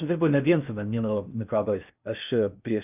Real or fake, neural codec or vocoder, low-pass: fake; codec, 16 kHz in and 24 kHz out, 0.6 kbps, FocalCodec, streaming, 2048 codes; 3.6 kHz